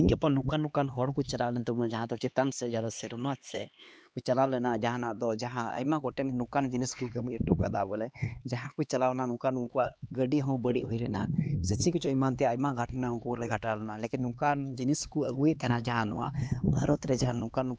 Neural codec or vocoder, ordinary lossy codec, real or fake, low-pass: codec, 16 kHz, 2 kbps, X-Codec, HuBERT features, trained on LibriSpeech; none; fake; none